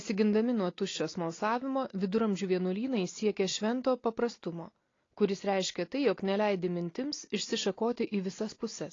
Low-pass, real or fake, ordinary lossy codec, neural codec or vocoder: 7.2 kHz; real; AAC, 32 kbps; none